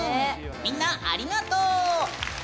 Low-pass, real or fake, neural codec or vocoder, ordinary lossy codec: none; real; none; none